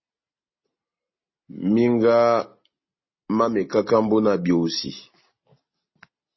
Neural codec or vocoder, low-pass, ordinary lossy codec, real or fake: none; 7.2 kHz; MP3, 24 kbps; real